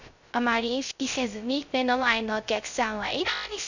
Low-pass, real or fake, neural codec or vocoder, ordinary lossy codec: 7.2 kHz; fake; codec, 16 kHz, 0.3 kbps, FocalCodec; none